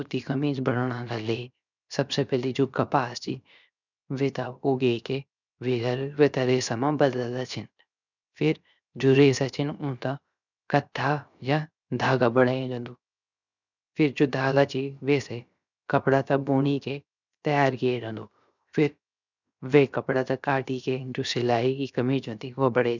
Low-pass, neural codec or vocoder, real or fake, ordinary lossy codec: 7.2 kHz; codec, 16 kHz, 0.7 kbps, FocalCodec; fake; none